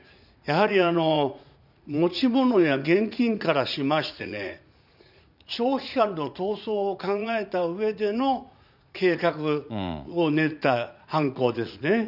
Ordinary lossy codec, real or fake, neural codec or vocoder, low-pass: none; fake; vocoder, 44.1 kHz, 80 mel bands, Vocos; 5.4 kHz